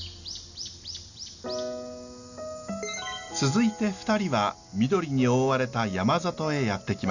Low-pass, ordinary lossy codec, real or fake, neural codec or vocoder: 7.2 kHz; none; real; none